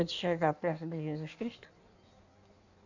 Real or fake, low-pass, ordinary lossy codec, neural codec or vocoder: fake; 7.2 kHz; none; codec, 16 kHz in and 24 kHz out, 1.1 kbps, FireRedTTS-2 codec